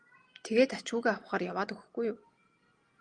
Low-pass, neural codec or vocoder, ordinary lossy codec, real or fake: 9.9 kHz; none; Opus, 32 kbps; real